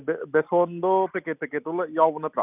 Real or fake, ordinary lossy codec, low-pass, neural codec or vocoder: real; none; 3.6 kHz; none